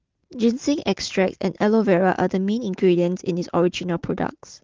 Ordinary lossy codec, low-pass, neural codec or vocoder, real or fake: Opus, 16 kbps; 7.2 kHz; none; real